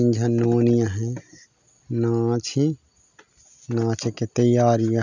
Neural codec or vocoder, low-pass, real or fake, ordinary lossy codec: none; 7.2 kHz; real; none